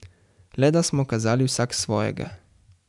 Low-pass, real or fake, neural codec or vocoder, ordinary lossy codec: 10.8 kHz; real; none; none